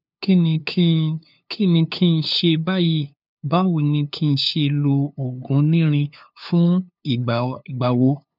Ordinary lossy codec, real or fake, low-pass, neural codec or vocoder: none; fake; 5.4 kHz; codec, 16 kHz, 2 kbps, FunCodec, trained on LibriTTS, 25 frames a second